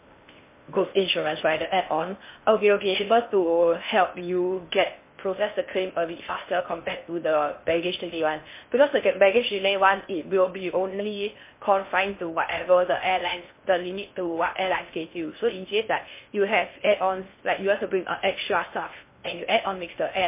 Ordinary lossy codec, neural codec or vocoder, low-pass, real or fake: MP3, 24 kbps; codec, 16 kHz in and 24 kHz out, 0.6 kbps, FocalCodec, streaming, 4096 codes; 3.6 kHz; fake